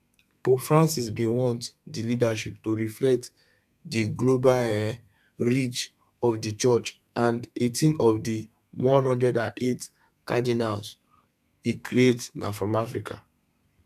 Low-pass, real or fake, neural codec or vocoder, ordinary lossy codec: 14.4 kHz; fake; codec, 32 kHz, 1.9 kbps, SNAC; none